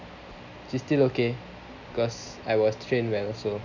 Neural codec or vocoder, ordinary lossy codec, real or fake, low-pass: none; none; real; 7.2 kHz